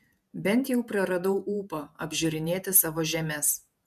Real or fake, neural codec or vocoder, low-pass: fake; vocoder, 48 kHz, 128 mel bands, Vocos; 14.4 kHz